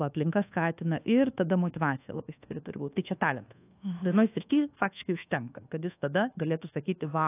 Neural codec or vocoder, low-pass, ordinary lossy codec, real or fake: codec, 24 kHz, 1.2 kbps, DualCodec; 3.6 kHz; AAC, 24 kbps; fake